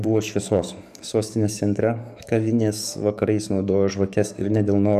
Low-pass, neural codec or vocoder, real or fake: 14.4 kHz; codec, 44.1 kHz, 7.8 kbps, DAC; fake